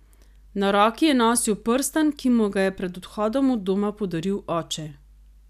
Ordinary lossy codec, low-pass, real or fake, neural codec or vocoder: none; 14.4 kHz; real; none